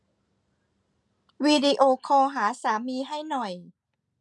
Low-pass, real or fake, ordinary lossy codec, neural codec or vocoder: 10.8 kHz; real; MP3, 96 kbps; none